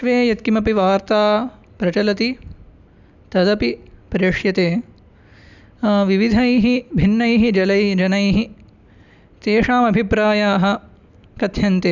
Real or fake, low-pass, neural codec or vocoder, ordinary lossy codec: real; 7.2 kHz; none; none